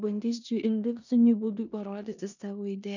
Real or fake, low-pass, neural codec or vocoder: fake; 7.2 kHz; codec, 16 kHz in and 24 kHz out, 0.9 kbps, LongCat-Audio-Codec, four codebook decoder